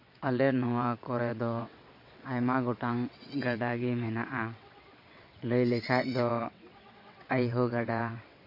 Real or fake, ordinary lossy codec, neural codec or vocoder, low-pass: fake; MP3, 32 kbps; vocoder, 22.05 kHz, 80 mel bands, WaveNeXt; 5.4 kHz